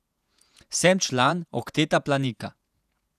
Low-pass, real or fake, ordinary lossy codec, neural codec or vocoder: 14.4 kHz; fake; none; vocoder, 48 kHz, 128 mel bands, Vocos